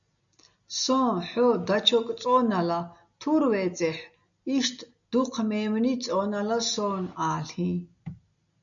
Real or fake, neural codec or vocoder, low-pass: real; none; 7.2 kHz